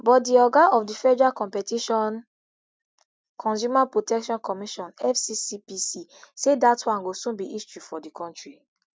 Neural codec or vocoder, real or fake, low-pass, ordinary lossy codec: none; real; none; none